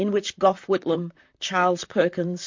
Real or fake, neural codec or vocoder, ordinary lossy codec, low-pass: fake; vocoder, 44.1 kHz, 128 mel bands, Pupu-Vocoder; MP3, 48 kbps; 7.2 kHz